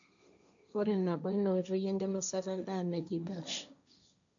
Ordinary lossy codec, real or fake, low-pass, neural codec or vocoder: none; fake; 7.2 kHz; codec, 16 kHz, 1.1 kbps, Voila-Tokenizer